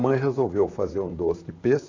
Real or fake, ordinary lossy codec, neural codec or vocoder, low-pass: fake; AAC, 48 kbps; vocoder, 44.1 kHz, 128 mel bands, Pupu-Vocoder; 7.2 kHz